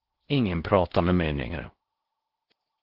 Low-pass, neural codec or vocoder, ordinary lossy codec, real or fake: 5.4 kHz; codec, 16 kHz in and 24 kHz out, 0.6 kbps, FocalCodec, streaming, 4096 codes; Opus, 16 kbps; fake